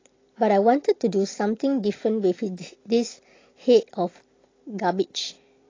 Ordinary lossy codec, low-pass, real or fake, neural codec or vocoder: AAC, 32 kbps; 7.2 kHz; real; none